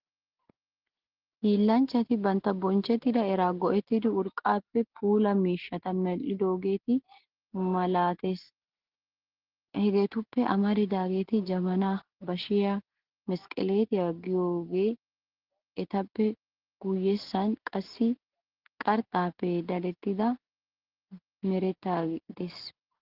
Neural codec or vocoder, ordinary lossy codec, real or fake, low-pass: none; Opus, 16 kbps; real; 5.4 kHz